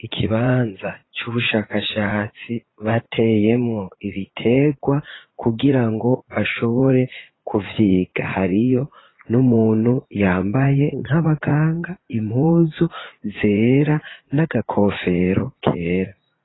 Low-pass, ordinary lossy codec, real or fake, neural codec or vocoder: 7.2 kHz; AAC, 16 kbps; fake; vocoder, 44.1 kHz, 80 mel bands, Vocos